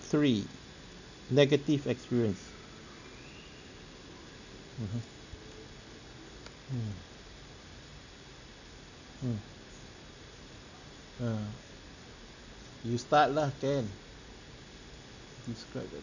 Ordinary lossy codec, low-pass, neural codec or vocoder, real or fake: none; 7.2 kHz; none; real